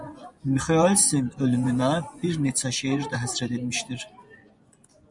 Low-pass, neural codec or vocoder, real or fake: 10.8 kHz; vocoder, 24 kHz, 100 mel bands, Vocos; fake